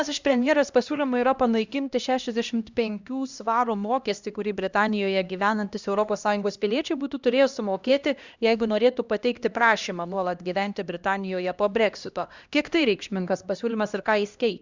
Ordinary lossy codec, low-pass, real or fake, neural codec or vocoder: Opus, 64 kbps; 7.2 kHz; fake; codec, 16 kHz, 1 kbps, X-Codec, HuBERT features, trained on LibriSpeech